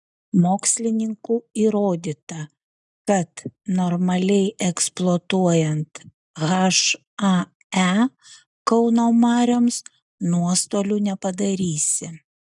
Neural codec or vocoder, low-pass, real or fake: none; 10.8 kHz; real